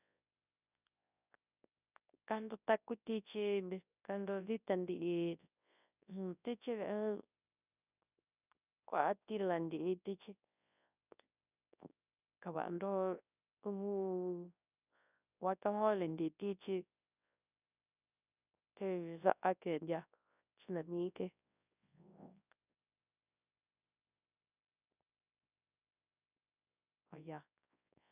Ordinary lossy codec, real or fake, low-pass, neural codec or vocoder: none; fake; 3.6 kHz; codec, 24 kHz, 0.9 kbps, WavTokenizer, large speech release